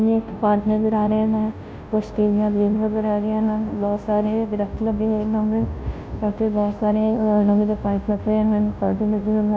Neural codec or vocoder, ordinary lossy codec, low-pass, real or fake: codec, 16 kHz, 0.5 kbps, FunCodec, trained on Chinese and English, 25 frames a second; none; none; fake